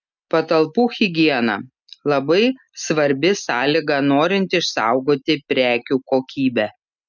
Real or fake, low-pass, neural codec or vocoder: real; 7.2 kHz; none